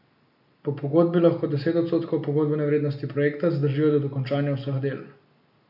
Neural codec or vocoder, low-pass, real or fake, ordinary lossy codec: none; 5.4 kHz; real; none